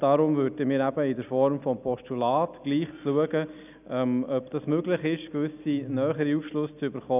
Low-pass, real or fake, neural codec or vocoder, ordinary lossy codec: 3.6 kHz; real; none; none